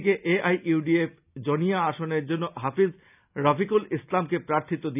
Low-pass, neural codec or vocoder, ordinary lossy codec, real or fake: 3.6 kHz; none; none; real